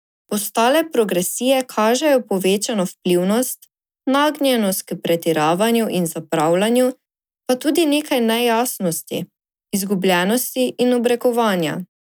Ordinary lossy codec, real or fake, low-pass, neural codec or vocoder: none; real; none; none